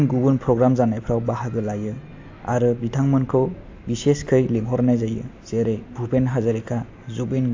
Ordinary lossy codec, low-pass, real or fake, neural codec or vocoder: AAC, 48 kbps; 7.2 kHz; real; none